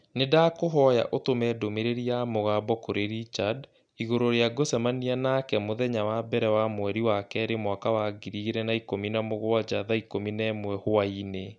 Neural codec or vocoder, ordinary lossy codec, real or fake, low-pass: none; none; real; 9.9 kHz